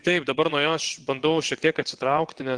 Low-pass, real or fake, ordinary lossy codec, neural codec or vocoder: 9.9 kHz; fake; Opus, 24 kbps; vocoder, 22.05 kHz, 80 mel bands, WaveNeXt